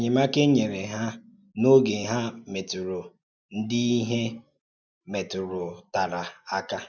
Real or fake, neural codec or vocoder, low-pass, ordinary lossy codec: real; none; none; none